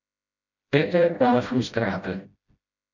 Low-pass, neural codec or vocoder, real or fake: 7.2 kHz; codec, 16 kHz, 0.5 kbps, FreqCodec, smaller model; fake